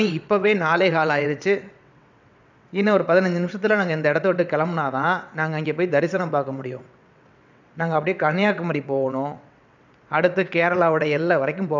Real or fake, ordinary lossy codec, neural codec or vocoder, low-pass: fake; none; vocoder, 22.05 kHz, 80 mel bands, WaveNeXt; 7.2 kHz